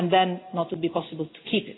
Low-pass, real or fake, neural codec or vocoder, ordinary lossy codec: 7.2 kHz; real; none; AAC, 16 kbps